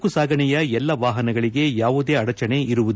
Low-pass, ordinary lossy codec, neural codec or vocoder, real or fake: none; none; none; real